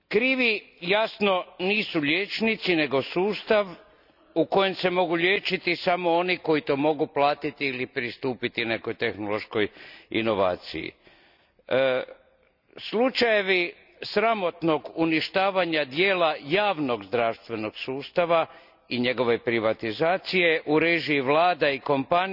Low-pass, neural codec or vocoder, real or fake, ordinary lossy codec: 5.4 kHz; none; real; none